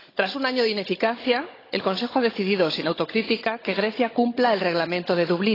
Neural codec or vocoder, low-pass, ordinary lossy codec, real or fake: codec, 16 kHz, 16 kbps, FunCodec, trained on Chinese and English, 50 frames a second; 5.4 kHz; AAC, 24 kbps; fake